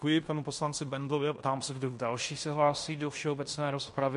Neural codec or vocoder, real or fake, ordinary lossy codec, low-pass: codec, 16 kHz in and 24 kHz out, 0.9 kbps, LongCat-Audio-Codec, fine tuned four codebook decoder; fake; MP3, 48 kbps; 10.8 kHz